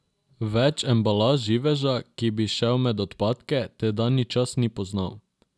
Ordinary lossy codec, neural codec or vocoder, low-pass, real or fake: none; none; none; real